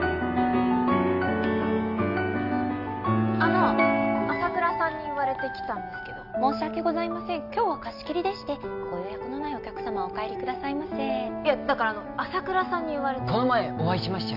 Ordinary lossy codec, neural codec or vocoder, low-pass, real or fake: none; none; 5.4 kHz; real